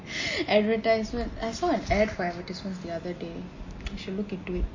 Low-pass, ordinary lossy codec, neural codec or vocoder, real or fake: 7.2 kHz; MP3, 32 kbps; none; real